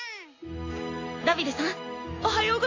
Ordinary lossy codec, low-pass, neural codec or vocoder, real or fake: AAC, 32 kbps; 7.2 kHz; none; real